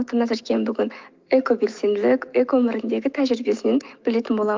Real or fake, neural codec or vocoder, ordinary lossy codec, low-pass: fake; autoencoder, 48 kHz, 128 numbers a frame, DAC-VAE, trained on Japanese speech; Opus, 32 kbps; 7.2 kHz